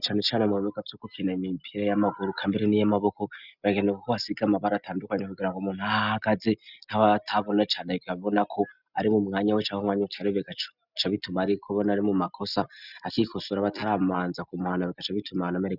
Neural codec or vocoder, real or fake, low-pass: none; real; 5.4 kHz